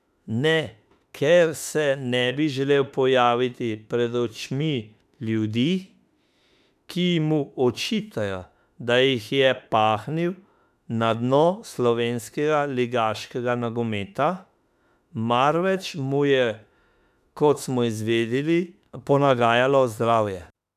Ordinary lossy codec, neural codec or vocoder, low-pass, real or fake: none; autoencoder, 48 kHz, 32 numbers a frame, DAC-VAE, trained on Japanese speech; 14.4 kHz; fake